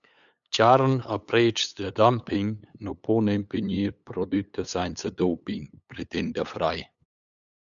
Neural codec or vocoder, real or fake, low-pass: codec, 16 kHz, 8 kbps, FunCodec, trained on LibriTTS, 25 frames a second; fake; 7.2 kHz